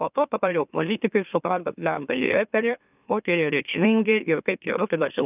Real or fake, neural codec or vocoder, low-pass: fake; autoencoder, 44.1 kHz, a latent of 192 numbers a frame, MeloTTS; 3.6 kHz